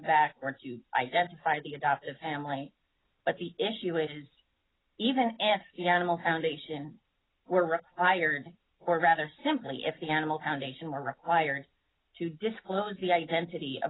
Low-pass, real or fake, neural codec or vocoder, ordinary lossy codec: 7.2 kHz; real; none; AAC, 16 kbps